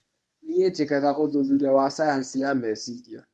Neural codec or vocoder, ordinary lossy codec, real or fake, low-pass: codec, 24 kHz, 0.9 kbps, WavTokenizer, medium speech release version 1; none; fake; none